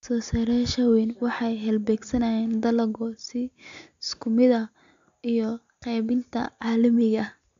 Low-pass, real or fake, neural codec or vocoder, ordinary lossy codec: 7.2 kHz; real; none; AAC, 64 kbps